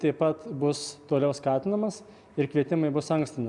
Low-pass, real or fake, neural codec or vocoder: 10.8 kHz; real; none